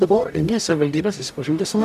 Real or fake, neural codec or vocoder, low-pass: fake; codec, 44.1 kHz, 0.9 kbps, DAC; 14.4 kHz